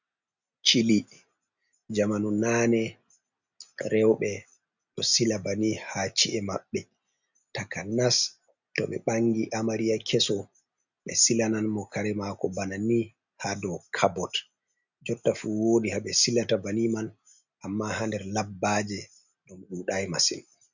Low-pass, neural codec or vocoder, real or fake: 7.2 kHz; none; real